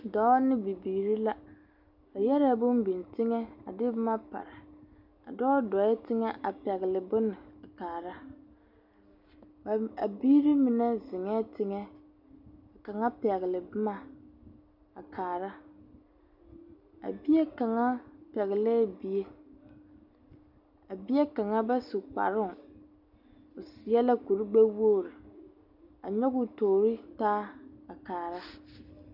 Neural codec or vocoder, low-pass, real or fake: none; 5.4 kHz; real